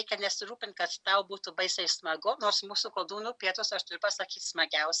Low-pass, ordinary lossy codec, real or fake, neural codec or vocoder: 9.9 kHz; MP3, 96 kbps; real; none